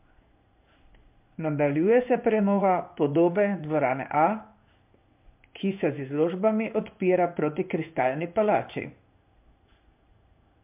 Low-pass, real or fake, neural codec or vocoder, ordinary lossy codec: 3.6 kHz; fake; codec, 16 kHz in and 24 kHz out, 1 kbps, XY-Tokenizer; MP3, 32 kbps